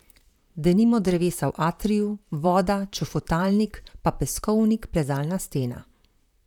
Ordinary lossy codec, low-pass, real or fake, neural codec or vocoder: none; 19.8 kHz; fake; vocoder, 44.1 kHz, 128 mel bands, Pupu-Vocoder